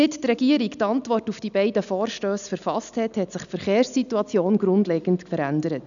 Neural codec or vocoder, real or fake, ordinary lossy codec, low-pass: none; real; none; 7.2 kHz